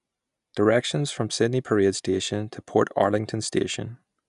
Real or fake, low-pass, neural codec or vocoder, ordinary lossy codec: real; 10.8 kHz; none; none